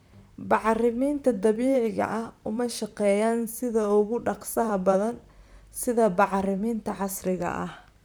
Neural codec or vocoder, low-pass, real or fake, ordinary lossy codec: vocoder, 44.1 kHz, 128 mel bands, Pupu-Vocoder; none; fake; none